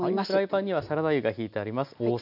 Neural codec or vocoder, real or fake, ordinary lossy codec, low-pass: none; real; none; 5.4 kHz